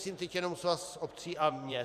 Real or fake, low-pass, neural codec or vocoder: fake; 14.4 kHz; vocoder, 44.1 kHz, 128 mel bands every 512 samples, BigVGAN v2